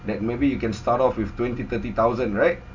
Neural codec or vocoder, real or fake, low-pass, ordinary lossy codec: none; real; 7.2 kHz; MP3, 64 kbps